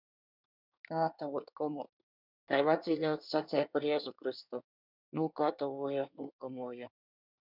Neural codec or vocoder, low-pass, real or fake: codec, 24 kHz, 1 kbps, SNAC; 5.4 kHz; fake